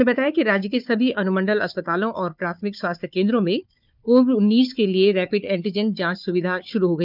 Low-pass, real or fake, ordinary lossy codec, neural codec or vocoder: 5.4 kHz; fake; none; codec, 16 kHz, 4 kbps, FunCodec, trained on Chinese and English, 50 frames a second